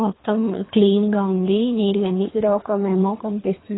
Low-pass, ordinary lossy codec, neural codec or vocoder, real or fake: 7.2 kHz; AAC, 16 kbps; codec, 24 kHz, 3 kbps, HILCodec; fake